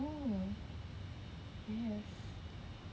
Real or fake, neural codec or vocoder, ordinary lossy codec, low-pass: real; none; none; none